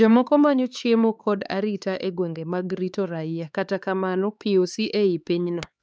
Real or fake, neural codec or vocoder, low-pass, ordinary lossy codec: fake; codec, 16 kHz, 4 kbps, X-Codec, HuBERT features, trained on LibriSpeech; none; none